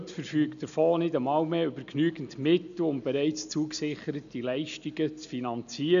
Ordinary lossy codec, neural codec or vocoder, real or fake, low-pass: none; none; real; 7.2 kHz